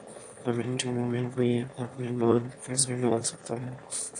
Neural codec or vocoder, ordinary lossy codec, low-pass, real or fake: autoencoder, 22.05 kHz, a latent of 192 numbers a frame, VITS, trained on one speaker; AAC, 64 kbps; 9.9 kHz; fake